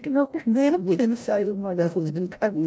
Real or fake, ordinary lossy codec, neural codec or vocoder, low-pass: fake; none; codec, 16 kHz, 0.5 kbps, FreqCodec, larger model; none